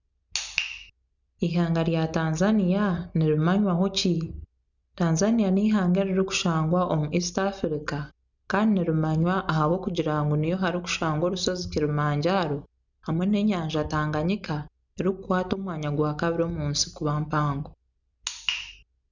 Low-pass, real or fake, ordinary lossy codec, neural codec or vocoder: 7.2 kHz; real; none; none